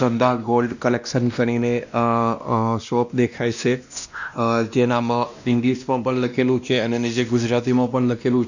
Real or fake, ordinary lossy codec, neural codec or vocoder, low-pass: fake; none; codec, 16 kHz, 1 kbps, X-Codec, WavLM features, trained on Multilingual LibriSpeech; 7.2 kHz